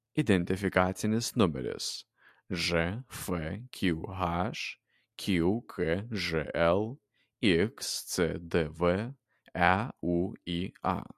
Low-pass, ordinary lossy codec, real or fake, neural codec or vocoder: 14.4 kHz; MP3, 64 kbps; real; none